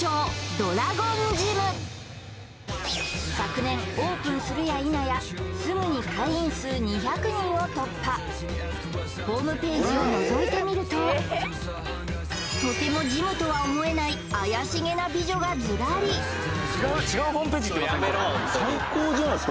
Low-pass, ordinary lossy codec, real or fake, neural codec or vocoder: none; none; real; none